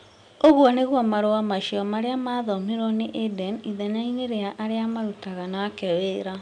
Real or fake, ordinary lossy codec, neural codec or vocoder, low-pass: real; none; none; 9.9 kHz